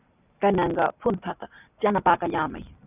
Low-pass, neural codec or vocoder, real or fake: 3.6 kHz; none; real